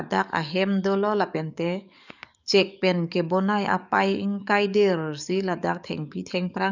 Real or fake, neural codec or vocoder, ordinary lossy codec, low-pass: fake; codec, 44.1 kHz, 7.8 kbps, DAC; none; 7.2 kHz